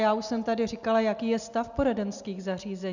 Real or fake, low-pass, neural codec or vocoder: real; 7.2 kHz; none